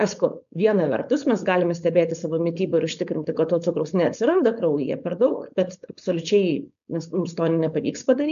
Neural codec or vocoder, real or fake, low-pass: codec, 16 kHz, 4.8 kbps, FACodec; fake; 7.2 kHz